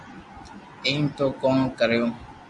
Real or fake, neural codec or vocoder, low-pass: real; none; 10.8 kHz